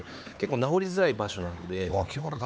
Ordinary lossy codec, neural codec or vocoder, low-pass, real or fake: none; codec, 16 kHz, 4 kbps, X-Codec, HuBERT features, trained on LibriSpeech; none; fake